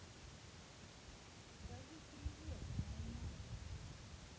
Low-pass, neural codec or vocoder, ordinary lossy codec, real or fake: none; none; none; real